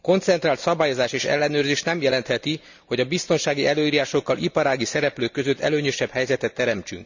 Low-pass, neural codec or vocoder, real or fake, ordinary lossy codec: 7.2 kHz; none; real; none